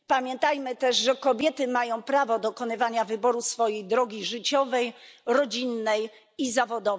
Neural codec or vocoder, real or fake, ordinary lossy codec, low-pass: none; real; none; none